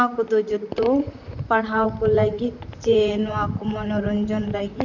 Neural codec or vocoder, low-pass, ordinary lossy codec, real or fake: vocoder, 44.1 kHz, 128 mel bands, Pupu-Vocoder; 7.2 kHz; none; fake